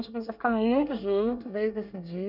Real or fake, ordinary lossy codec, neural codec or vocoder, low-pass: fake; none; codec, 24 kHz, 1 kbps, SNAC; 5.4 kHz